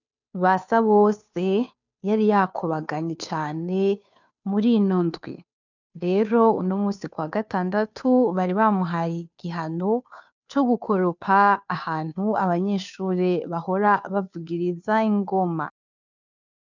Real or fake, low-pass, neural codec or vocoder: fake; 7.2 kHz; codec, 16 kHz, 2 kbps, FunCodec, trained on Chinese and English, 25 frames a second